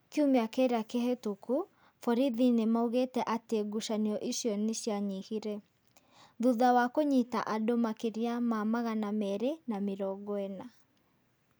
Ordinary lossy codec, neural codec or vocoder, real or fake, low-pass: none; none; real; none